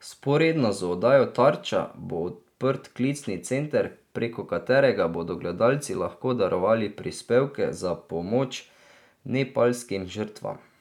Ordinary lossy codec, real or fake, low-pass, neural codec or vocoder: none; real; 19.8 kHz; none